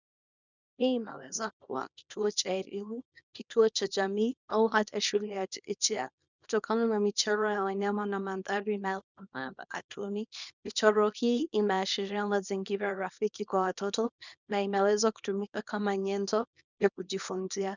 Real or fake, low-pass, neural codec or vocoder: fake; 7.2 kHz; codec, 24 kHz, 0.9 kbps, WavTokenizer, small release